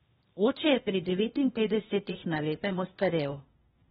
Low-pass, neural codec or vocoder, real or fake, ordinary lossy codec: 7.2 kHz; codec, 16 kHz, 0.8 kbps, ZipCodec; fake; AAC, 16 kbps